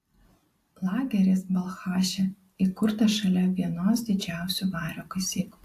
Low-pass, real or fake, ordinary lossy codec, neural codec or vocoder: 14.4 kHz; real; AAC, 64 kbps; none